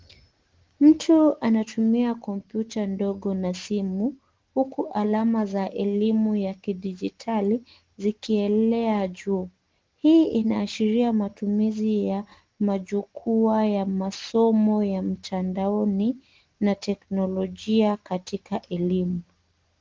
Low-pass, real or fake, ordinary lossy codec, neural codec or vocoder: 7.2 kHz; real; Opus, 16 kbps; none